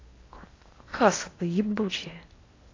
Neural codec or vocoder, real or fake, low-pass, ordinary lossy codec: codec, 16 kHz in and 24 kHz out, 0.8 kbps, FocalCodec, streaming, 65536 codes; fake; 7.2 kHz; AAC, 32 kbps